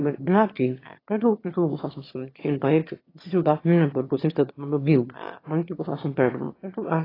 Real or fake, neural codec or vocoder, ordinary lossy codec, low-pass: fake; autoencoder, 22.05 kHz, a latent of 192 numbers a frame, VITS, trained on one speaker; AAC, 24 kbps; 5.4 kHz